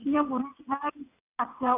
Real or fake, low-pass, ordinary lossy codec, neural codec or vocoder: fake; 3.6 kHz; none; vocoder, 22.05 kHz, 80 mel bands, WaveNeXt